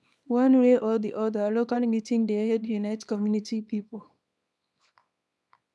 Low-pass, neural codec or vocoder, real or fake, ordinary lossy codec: none; codec, 24 kHz, 0.9 kbps, WavTokenizer, small release; fake; none